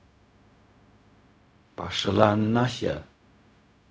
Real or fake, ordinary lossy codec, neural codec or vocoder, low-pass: fake; none; codec, 16 kHz, 0.4 kbps, LongCat-Audio-Codec; none